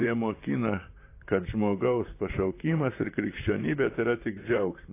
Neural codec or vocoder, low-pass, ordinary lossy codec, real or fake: vocoder, 44.1 kHz, 128 mel bands, Pupu-Vocoder; 3.6 kHz; AAC, 24 kbps; fake